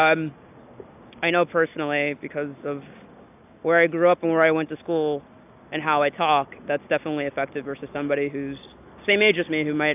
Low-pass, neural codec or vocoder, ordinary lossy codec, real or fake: 3.6 kHz; none; AAC, 32 kbps; real